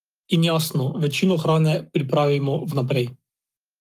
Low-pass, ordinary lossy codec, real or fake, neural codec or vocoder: 14.4 kHz; Opus, 32 kbps; fake; codec, 44.1 kHz, 7.8 kbps, Pupu-Codec